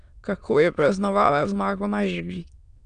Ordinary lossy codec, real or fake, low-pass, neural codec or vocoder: none; fake; 9.9 kHz; autoencoder, 22.05 kHz, a latent of 192 numbers a frame, VITS, trained on many speakers